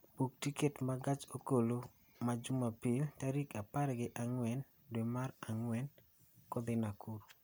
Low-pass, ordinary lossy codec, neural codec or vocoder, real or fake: none; none; none; real